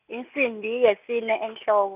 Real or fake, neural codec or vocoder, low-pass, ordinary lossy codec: real; none; 3.6 kHz; none